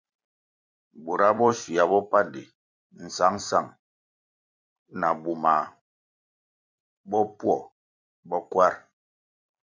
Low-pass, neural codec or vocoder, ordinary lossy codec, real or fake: 7.2 kHz; vocoder, 44.1 kHz, 128 mel bands every 256 samples, BigVGAN v2; MP3, 64 kbps; fake